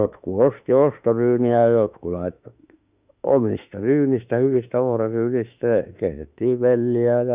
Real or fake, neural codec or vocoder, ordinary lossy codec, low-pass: fake; autoencoder, 48 kHz, 32 numbers a frame, DAC-VAE, trained on Japanese speech; Opus, 64 kbps; 3.6 kHz